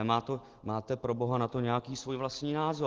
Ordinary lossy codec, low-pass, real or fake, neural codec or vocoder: Opus, 16 kbps; 7.2 kHz; real; none